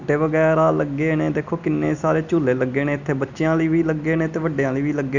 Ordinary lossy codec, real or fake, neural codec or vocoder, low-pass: none; real; none; 7.2 kHz